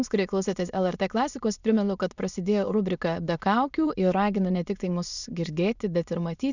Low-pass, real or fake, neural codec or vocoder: 7.2 kHz; fake; autoencoder, 22.05 kHz, a latent of 192 numbers a frame, VITS, trained on many speakers